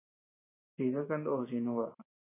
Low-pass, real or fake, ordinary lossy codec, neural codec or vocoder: 3.6 kHz; fake; AAC, 16 kbps; vocoder, 44.1 kHz, 128 mel bands every 512 samples, BigVGAN v2